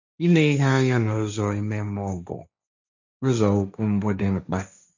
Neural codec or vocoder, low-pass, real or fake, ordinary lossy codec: codec, 16 kHz, 1.1 kbps, Voila-Tokenizer; 7.2 kHz; fake; none